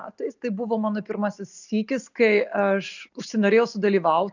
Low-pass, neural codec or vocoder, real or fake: 7.2 kHz; none; real